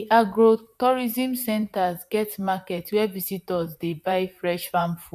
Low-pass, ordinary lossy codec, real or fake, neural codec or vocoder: 14.4 kHz; none; fake; vocoder, 44.1 kHz, 128 mel bands, Pupu-Vocoder